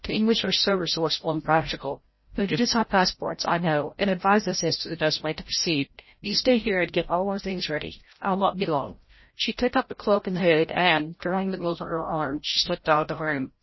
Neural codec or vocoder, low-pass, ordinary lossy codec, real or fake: codec, 16 kHz, 0.5 kbps, FreqCodec, larger model; 7.2 kHz; MP3, 24 kbps; fake